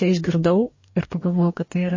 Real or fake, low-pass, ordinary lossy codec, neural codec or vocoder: fake; 7.2 kHz; MP3, 32 kbps; codec, 44.1 kHz, 2.6 kbps, DAC